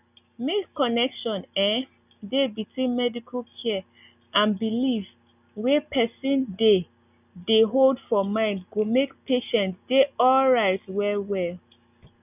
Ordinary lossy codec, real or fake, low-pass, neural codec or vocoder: none; real; 3.6 kHz; none